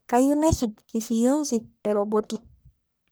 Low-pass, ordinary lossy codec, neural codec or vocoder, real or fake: none; none; codec, 44.1 kHz, 1.7 kbps, Pupu-Codec; fake